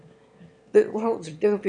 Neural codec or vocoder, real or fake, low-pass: autoencoder, 22.05 kHz, a latent of 192 numbers a frame, VITS, trained on one speaker; fake; 9.9 kHz